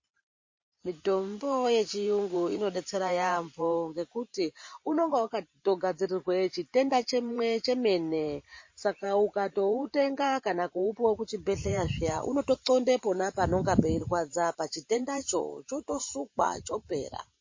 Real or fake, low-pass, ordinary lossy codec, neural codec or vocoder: fake; 7.2 kHz; MP3, 32 kbps; vocoder, 44.1 kHz, 128 mel bands every 512 samples, BigVGAN v2